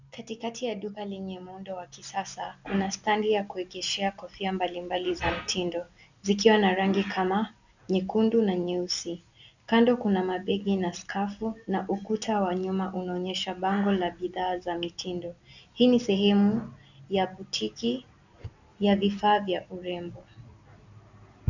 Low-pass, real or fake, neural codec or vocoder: 7.2 kHz; real; none